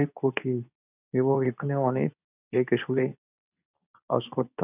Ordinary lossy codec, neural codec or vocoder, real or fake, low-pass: none; codec, 24 kHz, 0.9 kbps, WavTokenizer, medium speech release version 2; fake; 3.6 kHz